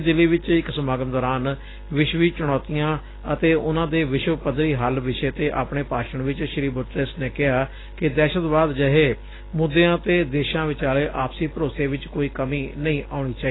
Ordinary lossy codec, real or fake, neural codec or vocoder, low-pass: AAC, 16 kbps; real; none; 7.2 kHz